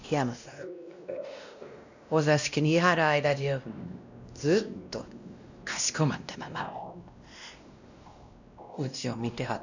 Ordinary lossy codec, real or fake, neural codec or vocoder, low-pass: none; fake; codec, 16 kHz, 1 kbps, X-Codec, WavLM features, trained on Multilingual LibriSpeech; 7.2 kHz